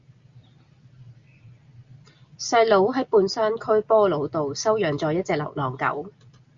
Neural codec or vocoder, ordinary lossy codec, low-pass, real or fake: none; Opus, 64 kbps; 7.2 kHz; real